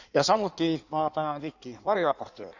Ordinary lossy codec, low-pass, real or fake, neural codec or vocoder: none; 7.2 kHz; fake; codec, 16 kHz in and 24 kHz out, 1.1 kbps, FireRedTTS-2 codec